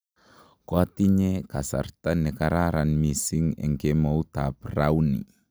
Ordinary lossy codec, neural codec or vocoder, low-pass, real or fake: none; none; none; real